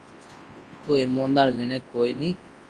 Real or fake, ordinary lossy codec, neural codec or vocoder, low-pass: fake; Opus, 24 kbps; codec, 24 kHz, 0.9 kbps, WavTokenizer, large speech release; 10.8 kHz